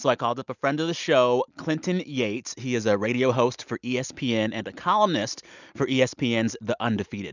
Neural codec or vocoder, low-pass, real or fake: none; 7.2 kHz; real